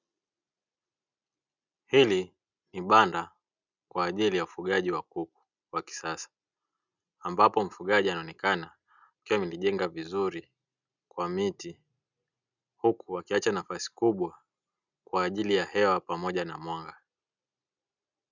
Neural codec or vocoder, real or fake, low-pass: none; real; 7.2 kHz